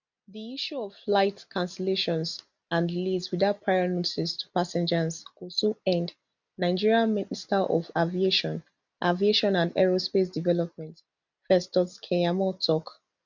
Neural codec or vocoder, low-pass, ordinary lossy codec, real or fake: none; 7.2 kHz; none; real